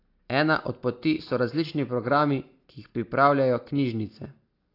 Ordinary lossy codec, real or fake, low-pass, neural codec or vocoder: AAC, 32 kbps; real; 5.4 kHz; none